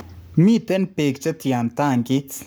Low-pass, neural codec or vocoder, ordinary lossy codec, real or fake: none; codec, 44.1 kHz, 7.8 kbps, Pupu-Codec; none; fake